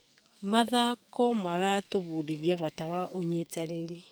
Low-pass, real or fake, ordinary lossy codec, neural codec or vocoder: none; fake; none; codec, 44.1 kHz, 2.6 kbps, SNAC